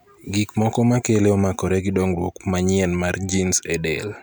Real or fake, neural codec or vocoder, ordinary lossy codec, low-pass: real; none; none; none